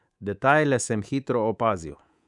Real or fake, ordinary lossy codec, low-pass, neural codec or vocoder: fake; none; 10.8 kHz; codec, 24 kHz, 3.1 kbps, DualCodec